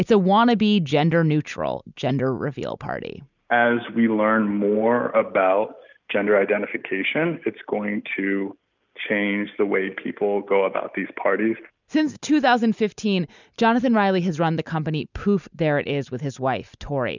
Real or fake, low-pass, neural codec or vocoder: real; 7.2 kHz; none